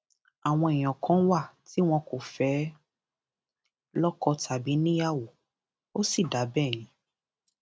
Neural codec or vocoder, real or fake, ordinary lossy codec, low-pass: none; real; none; none